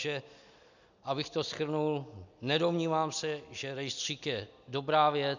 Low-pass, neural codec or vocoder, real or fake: 7.2 kHz; none; real